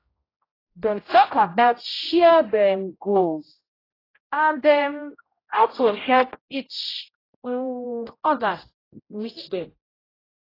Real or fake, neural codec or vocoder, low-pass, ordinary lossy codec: fake; codec, 16 kHz, 0.5 kbps, X-Codec, HuBERT features, trained on general audio; 5.4 kHz; AAC, 24 kbps